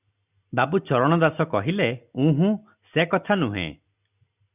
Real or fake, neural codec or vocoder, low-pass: real; none; 3.6 kHz